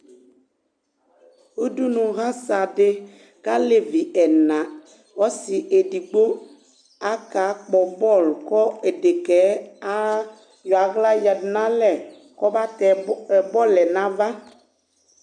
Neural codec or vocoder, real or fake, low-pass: none; real; 9.9 kHz